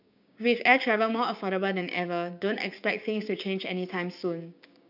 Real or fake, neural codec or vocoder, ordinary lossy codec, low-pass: fake; codec, 16 kHz, 6 kbps, DAC; none; 5.4 kHz